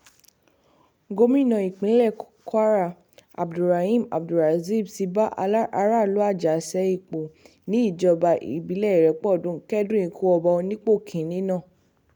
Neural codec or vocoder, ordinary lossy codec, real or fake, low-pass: none; none; real; 19.8 kHz